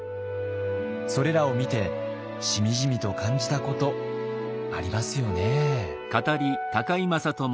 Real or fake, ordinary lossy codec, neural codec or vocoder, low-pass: real; none; none; none